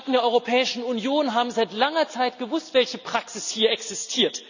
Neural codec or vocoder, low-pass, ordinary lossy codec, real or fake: none; 7.2 kHz; none; real